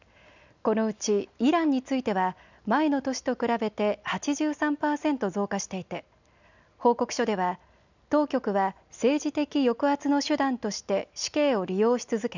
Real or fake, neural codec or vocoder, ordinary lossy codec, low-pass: real; none; none; 7.2 kHz